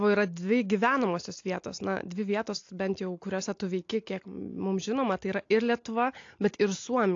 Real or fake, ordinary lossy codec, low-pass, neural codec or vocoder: real; AAC, 48 kbps; 7.2 kHz; none